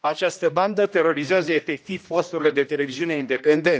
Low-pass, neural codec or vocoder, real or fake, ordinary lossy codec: none; codec, 16 kHz, 1 kbps, X-Codec, HuBERT features, trained on general audio; fake; none